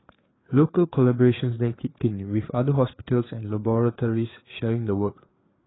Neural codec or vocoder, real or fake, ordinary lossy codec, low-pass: codec, 16 kHz, 4 kbps, FreqCodec, larger model; fake; AAC, 16 kbps; 7.2 kHz